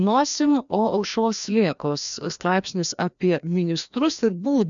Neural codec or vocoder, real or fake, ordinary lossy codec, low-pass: codec, 16 kHz, 1 kbps, FreqCodec, larger model; fake; MP3, 96 kbps; 7.2 kHz